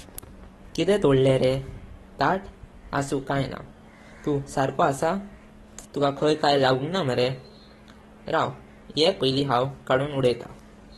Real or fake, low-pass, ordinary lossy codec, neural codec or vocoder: fake; 19.8 kHz; AAC, 32 kbps; codec, 44.1 kHz, 7.8 kbps, DAC